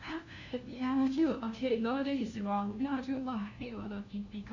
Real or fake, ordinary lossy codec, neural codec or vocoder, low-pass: fake; none; codec, 16 kHz, 1 kbps, FunCodec, trained on LibriTTS, 50 frames a second; 7.2 kHz